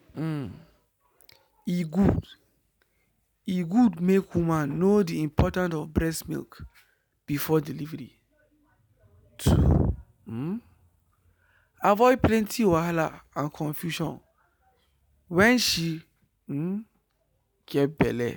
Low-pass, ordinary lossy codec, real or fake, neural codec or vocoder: none; none; real; none